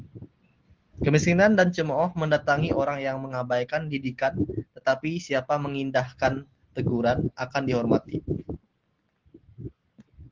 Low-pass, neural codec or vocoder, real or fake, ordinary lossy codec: 7.2 kHz; none; real; Opus, 16 kbps